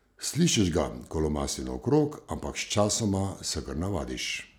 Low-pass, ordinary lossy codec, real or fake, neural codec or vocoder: none; none; real; none